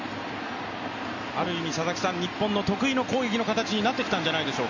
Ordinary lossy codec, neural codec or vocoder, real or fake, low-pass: none; none; real; 7.2 kHz